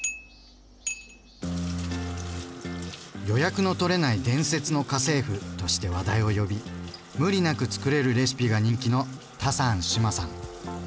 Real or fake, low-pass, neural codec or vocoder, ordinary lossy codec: real; none; none; none